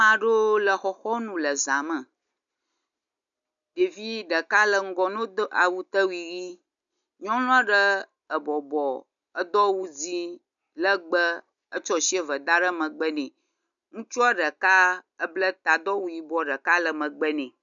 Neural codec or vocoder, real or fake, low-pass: none; real; 7.2 kHz